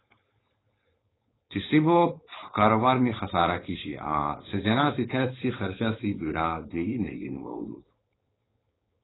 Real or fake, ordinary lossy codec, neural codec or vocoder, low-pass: fake; AAC, 16 kbps; codec, 16 kHz, 4.8 kbps, FACodec; 7.2 kHz